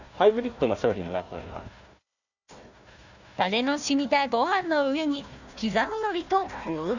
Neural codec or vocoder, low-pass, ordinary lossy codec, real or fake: codec, 16 kHz, 1 kbps, FunCodec, trained on Chinese and English, 50 frames a second; 7.2 kHz; none; fake